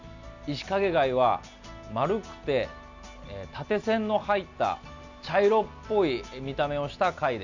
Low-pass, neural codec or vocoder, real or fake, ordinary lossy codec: 7.2 kHz; none; real; none